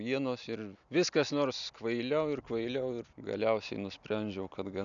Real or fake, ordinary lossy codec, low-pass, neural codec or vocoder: real; MP3, 96 kbps; 7.2 kHz; none